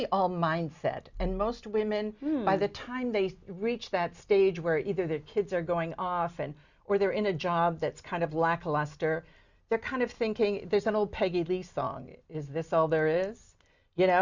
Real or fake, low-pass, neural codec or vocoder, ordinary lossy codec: real; 7.2 kHz; none; Opus, 64 kbps